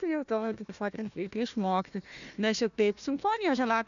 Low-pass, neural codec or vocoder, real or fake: 7.2 kHz; codec, 16 kHz, 1 kbps, FunCodec, trained on Chinese and English, 50 frames a second; fake